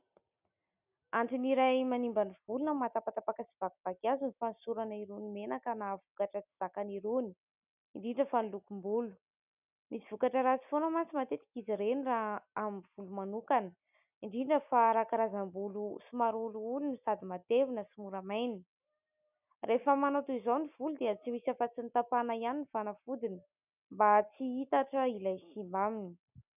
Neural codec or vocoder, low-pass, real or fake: none; 3.6 kHz; real